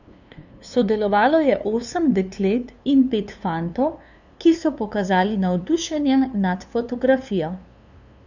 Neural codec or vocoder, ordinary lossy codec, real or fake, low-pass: codec, 16 kHz, 2 kbps, FunCodec, trained on LibriTTS, 25 frames a second; none; fake; 7.2 kHz